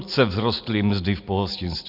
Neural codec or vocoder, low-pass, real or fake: none; 5.4 kHz; real